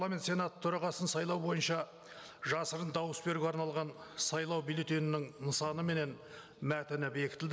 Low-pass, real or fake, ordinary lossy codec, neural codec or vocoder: none; real; none; none